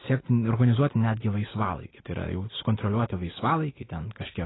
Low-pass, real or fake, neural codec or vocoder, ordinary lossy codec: 7.2 kHz; real; none; AAC, 16 kbps